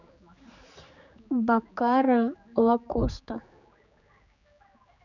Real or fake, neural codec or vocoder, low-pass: fake; codec, 16 kHz, 4 kbps, X-Codec, HuBERT features, trained on general audio; 7.2 kHz